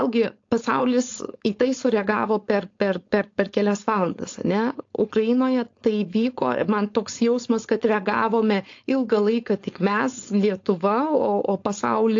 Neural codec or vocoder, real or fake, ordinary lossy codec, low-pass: codec, 16 kHz, 4.8 kbps, FACodec; fake; AAC, 48 kbps; 7.2 kHz